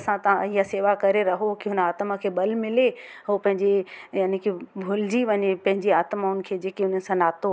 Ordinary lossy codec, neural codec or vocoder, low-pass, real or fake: none; none; none; real